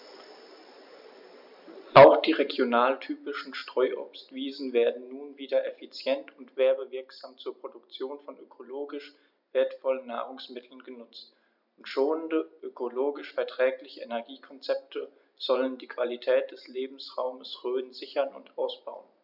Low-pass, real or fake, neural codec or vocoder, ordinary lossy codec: 5.4 kHz; real; none; none